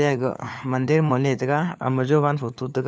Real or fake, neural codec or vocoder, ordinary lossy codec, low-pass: fake; codec, 16 kHz, 4 kbps, FunCodec, trained on LibriTTS, 50 frames a second; none; none